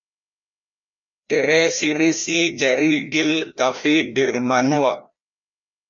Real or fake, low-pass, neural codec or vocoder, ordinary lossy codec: fake; 7.2 kHz; codec, 16 kHz, 1 kbps, FreqCodec, larger model; MP3, 48 kbps